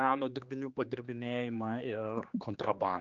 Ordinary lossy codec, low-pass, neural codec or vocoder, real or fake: Opus, 24 kbps; 7.2 kHz; codec, 16 kHz, 2 kbps, X-Codec, HuBERT features, trained on general audio; fake